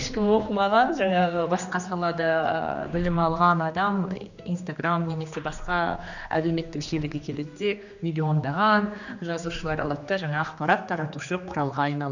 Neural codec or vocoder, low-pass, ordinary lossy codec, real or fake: codec, 16 kHz, 2 kbps, X-Codec, HuBERT features, trained on general audio; 7.2 kHz; none; fake